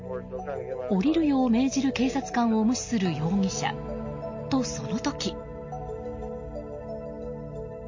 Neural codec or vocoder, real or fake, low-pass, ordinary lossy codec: none; real; 7.2 kHz; MP3, 32 kbps